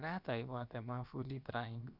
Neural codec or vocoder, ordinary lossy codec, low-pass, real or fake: codec, 16 kHz, about 1 kbps, DyCAST, with the encoder's durations; none; 5.4 kHz; fake